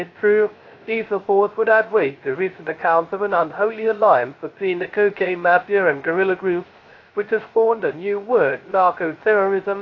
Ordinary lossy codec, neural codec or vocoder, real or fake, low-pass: AAC, 32 kbps; codec, 16 kHz, 0.3 kbps, FocalCodec; fake; 7.2 kHz